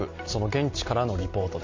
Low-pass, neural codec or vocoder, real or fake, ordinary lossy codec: 7.2 kHz; none; real; MP3, 48 kbps